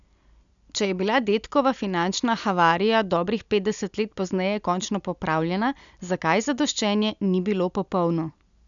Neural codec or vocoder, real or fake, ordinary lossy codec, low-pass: none; real; none; 7.2 kHz